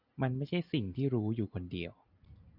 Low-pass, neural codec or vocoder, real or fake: 5.4 kHz; none; real